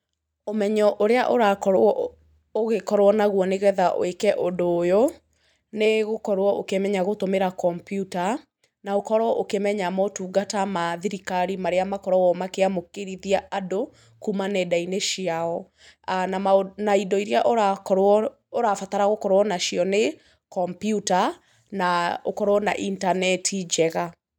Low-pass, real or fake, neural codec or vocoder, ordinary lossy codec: 19.8 kHz; real; none; none